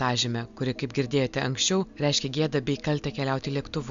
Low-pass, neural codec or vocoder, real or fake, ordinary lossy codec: 7.2 kHz; none; real; Opus, 64 kbps